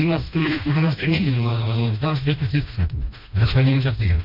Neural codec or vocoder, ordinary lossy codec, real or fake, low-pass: codec, 16 kHz, 1 kbps, FreqCodec, smaller model; MP3, 48 kbps; fake; 5.4 kHz